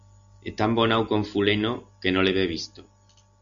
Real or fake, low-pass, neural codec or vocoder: real; 7.2 kHz; none